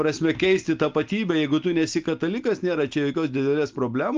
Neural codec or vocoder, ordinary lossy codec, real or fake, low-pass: none; Opus, 24 kbps; real; 7.2 kHz